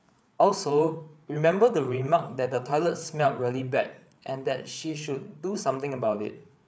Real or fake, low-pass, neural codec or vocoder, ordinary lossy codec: fake; none; codec, 16 kHz, 8 kbps, FreqCodec, larger model; none